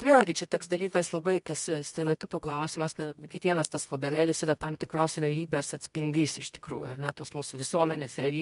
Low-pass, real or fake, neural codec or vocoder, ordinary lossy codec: 10.8 kHz; fake; codec, 24 kHz, 0.9 kbps, WavTokenizer, medium music audio release; MP3, 64 kbps